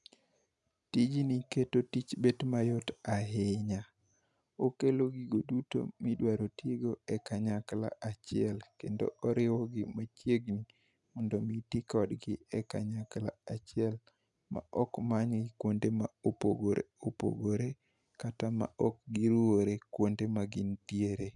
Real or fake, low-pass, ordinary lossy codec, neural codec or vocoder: real; 10.8 kHz; none; none